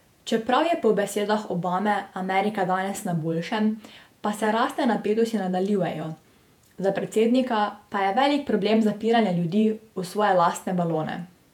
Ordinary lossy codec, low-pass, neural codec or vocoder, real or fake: none; 19.8 kHz; vocoder, 48 kHz, 128 mel bands, Vocos; fake